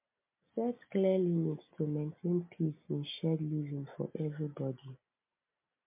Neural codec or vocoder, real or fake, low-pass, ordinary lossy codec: none; real; 3.6 kHz; MP3, 32 kbps